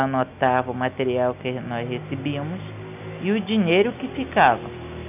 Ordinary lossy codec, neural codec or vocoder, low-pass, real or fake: none; none; 3.6 kHz; real